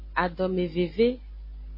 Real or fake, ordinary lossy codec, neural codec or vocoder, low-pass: real; MP3, 24 kbps; none; 5.4 kHz